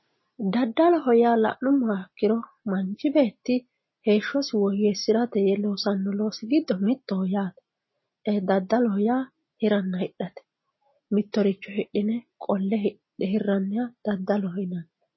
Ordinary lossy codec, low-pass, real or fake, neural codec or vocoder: MP3, 24 kbps; 7.2 kHz; real; none